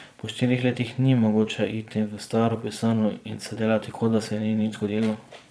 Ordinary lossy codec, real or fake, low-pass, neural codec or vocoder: none; fake; none; vocoder, 22.05 kHz, 80 mel bands, Vocos